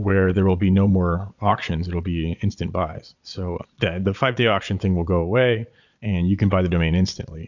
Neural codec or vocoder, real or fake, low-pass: vocoder, 22.05 kHz, 80 mel bands, Vocos; fake; 7.2 kHz